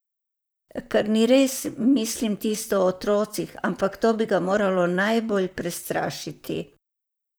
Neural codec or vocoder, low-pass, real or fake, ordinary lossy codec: vocoder, 44.1 kHz, 128 mel bands, Pupu-Vocoder; none; fake; none